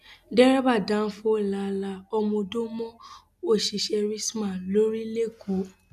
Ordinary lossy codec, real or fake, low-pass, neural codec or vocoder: none; real; 14.4 kHz; none